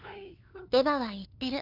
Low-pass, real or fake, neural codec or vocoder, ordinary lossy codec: 5.4 kHz; fake; codec, 16 kHz, 2 kbps, FunCodec, trained on LibriTTS, 25 frames a second; none